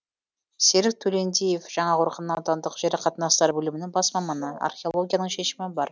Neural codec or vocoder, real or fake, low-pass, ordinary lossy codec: none; real; none; none